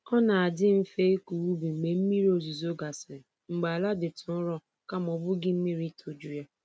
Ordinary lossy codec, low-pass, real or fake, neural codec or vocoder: none; none; real; none